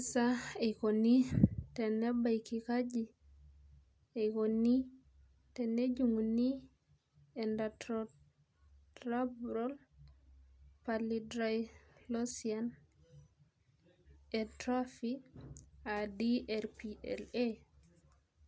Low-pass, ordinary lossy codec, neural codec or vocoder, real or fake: none; none; none; real